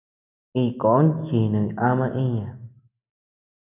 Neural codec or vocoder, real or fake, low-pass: none; real; 3.6 kHz